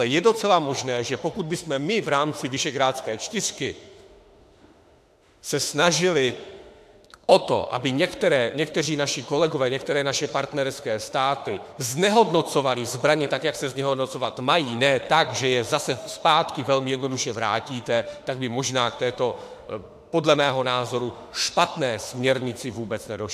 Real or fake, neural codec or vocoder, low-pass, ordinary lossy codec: fake; autoencoder, 48 kHz, 32 numbers a frame, DAC-VAE, trained on Japanese speech; 14.4 kHz; AAC, 96 kbps